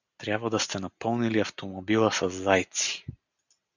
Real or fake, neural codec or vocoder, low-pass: real; none; 7.2 kHz